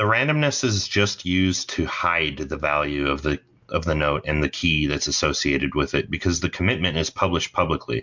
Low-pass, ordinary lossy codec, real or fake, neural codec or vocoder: 7.2 kHz; MP3, 64 kbps; real; none